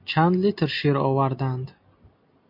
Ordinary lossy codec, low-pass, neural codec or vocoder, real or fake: MP3, 32 kbps; 5.4 kHz; none; real